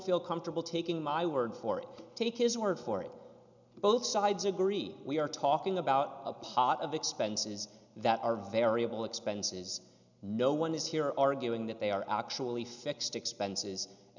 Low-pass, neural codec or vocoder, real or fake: 7.2 kHz; none; real